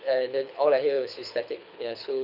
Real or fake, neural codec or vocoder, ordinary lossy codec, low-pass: fake; codec, 24 kHz, 6 kbps, HILCodec; none; 5.4 kHz